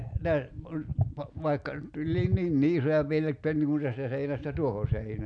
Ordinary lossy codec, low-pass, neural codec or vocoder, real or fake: none; 9.9 kHz; none; real